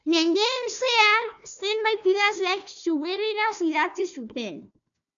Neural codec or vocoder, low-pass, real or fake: codec, 16 kHz, 1 kbps, FunCodec, trained on Chinese and English, 50 frames a second; 7.2 kHz; fake